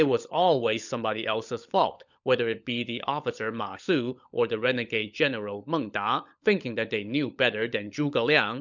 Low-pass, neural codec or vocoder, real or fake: 7.2 kHz; codec, 16 kHz, 16 kbps, FunCodec, trained on LibriTTS, 50 frames a second; fake